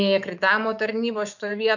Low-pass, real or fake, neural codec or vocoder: 7.2 kHz; fake; autoencoder, 48 kHz, 128 numbers a frame, DAC-VAE, trained on Japanese speech